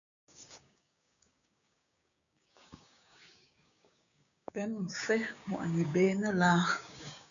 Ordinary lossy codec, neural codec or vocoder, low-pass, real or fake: MP3, 96 kbps; codec, 16 kHz, 6 kbps, DAC; 7.2 kHz; fake